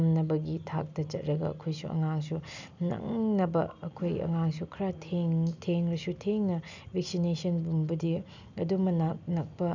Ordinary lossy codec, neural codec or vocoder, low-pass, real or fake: none; none; 7.2 kHz; real